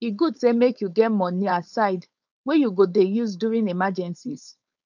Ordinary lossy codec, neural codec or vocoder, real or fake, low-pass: none; codec, 16 kHz, 4.8 kbps, FACodec; fake; 7.2 kHz